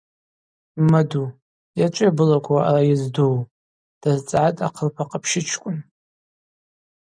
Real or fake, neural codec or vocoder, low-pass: real; none; 9.9 kHz